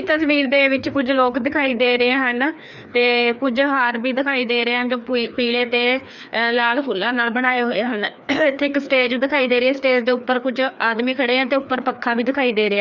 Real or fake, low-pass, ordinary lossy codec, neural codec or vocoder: fake; 7.2 kHz; none; codec, 16 kHz, 2 kbps, FreqCodec, larger model